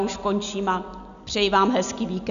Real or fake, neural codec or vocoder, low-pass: real; none; 7.2 kHz